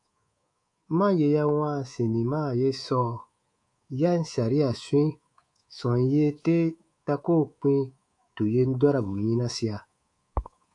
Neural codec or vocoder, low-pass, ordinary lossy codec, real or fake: codec, 24 kHz, 3.1 kbps, DualCodec; 10.8 kHz; AAC, 64 kbps; fake